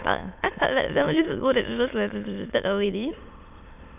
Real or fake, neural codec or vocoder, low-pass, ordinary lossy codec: fake; autoencoder, 22.05 kHz, a latent of 192 numbers a frame, VITS, trained on many speakers; 3.6 kHz; none